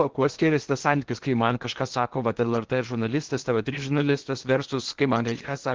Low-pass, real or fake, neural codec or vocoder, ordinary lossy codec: 7.2 kHz; fake; codec, 16 kHz in and 24 kHz out, 0.8 kbps, FocalCodec, streaming, 65536 codes; Opus, 16 kbps